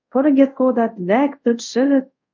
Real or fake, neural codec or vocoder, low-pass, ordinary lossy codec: fake; codec, 24 kHz, 0.5 kbps, DualCodec; 7.2 kHz; MP3, 64 kbps